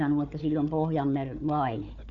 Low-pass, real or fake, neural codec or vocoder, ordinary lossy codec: 7.2 kHz; fake; codec, 16 kHz, 4 kbps, FunCodec, trained on Chinese and English, 50 frames a second; none